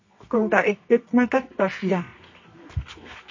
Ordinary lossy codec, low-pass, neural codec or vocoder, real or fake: MP3, 32 kbps; 7.2 kHz; codec, 24 kHz, 0.9 kbps, WavTokenizer, medium music audio release; fake